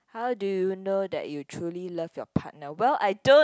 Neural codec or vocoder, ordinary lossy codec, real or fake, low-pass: none; none; real; none